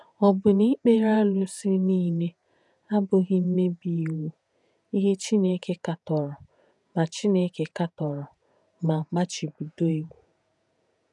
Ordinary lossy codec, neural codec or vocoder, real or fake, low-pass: none; vocoder, 48 kHz, 128 mel bands, Vocos; fake; 10.8 kHz